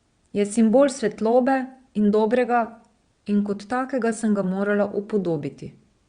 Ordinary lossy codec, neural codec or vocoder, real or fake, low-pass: Opus, 64 kbps; vocoder, 22.05 kHz, 80 mel bands, WaveNeXt; fake; 9.9 kHz